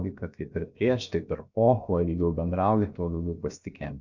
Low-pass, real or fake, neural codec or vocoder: 7.2 kHz; fake; codec, 16 kHz, 0.7 kbps, FocalCodec